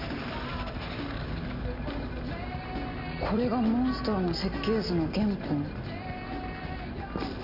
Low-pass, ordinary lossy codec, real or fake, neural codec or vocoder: 5.4 kHz; none; real; none